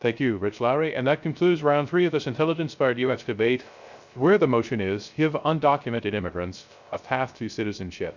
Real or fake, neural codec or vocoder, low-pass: fake; codec, 16 kHz, 0.3 kbps, FocalCodec; 7.2 kHz